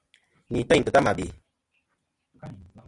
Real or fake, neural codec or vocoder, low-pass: real; none; 10.8 kHz